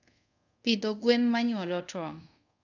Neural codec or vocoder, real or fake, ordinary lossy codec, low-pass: codec, 24 kHz, 0.5 kbps, DualCodec; fake; none; 7.2 kHz